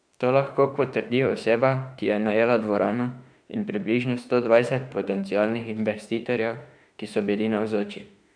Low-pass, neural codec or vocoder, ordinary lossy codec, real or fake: 9.9 kHz; autoencoder, 48 kHz, 32 numbers a frame, DAC-VAE, trained on Japanese speech; AAC, 64 kbps; fake